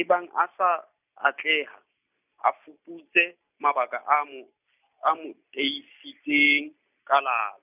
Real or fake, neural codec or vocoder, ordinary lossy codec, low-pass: real; none; none; 3.6 kHz